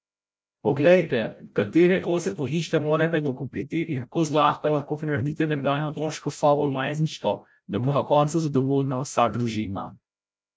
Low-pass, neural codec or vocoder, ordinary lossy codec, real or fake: none; codec, 16 kHz, 0.5 kbps, FreqCodec, larger model; none; fake